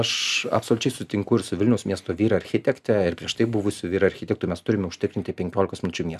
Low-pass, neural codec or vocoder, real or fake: 14.4 kHz; none; real